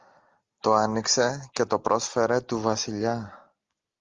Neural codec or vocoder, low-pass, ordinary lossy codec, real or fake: none; 7.2 kHz; Opus, 32 kbps; real